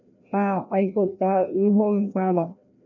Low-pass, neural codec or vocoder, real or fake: 7.2 kHz; codec, 16 kHz, 1 kbps, FreqCodec, larger model; fake